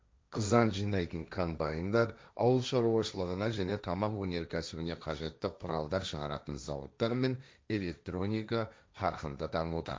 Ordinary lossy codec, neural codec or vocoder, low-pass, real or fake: none; codec, 16 kHz, 1.1 kbps, Voila-Tokenizer; none; fake